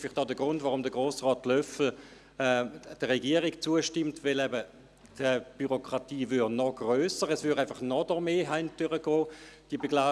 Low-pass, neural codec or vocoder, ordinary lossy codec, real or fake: none; none; none; real